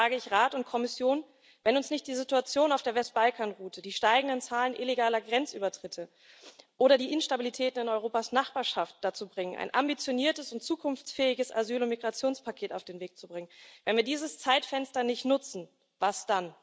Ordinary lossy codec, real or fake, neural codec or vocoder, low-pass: none; real; none; none